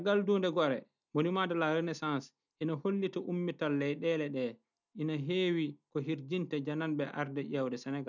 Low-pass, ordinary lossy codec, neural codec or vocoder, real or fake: 7.2 kHz; none; none; real